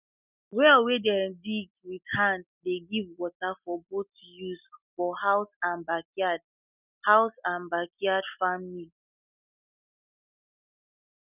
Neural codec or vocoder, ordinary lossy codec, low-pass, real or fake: none; none; 3.6 kHz; real